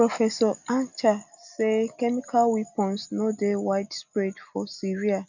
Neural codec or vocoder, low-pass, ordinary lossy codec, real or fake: none; 7.2 kHz; none; real